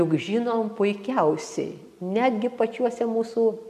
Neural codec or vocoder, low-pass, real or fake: none; 14.4 kHz; real